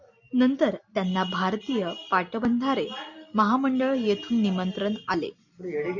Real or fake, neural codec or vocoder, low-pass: real; none; 7.2 kHz